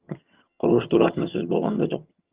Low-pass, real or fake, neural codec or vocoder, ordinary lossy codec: 3.6 kHz; fake; vocoder, 22.05 kHz, 80 mel bands, HiFi-GAN; Opus, 24 kbps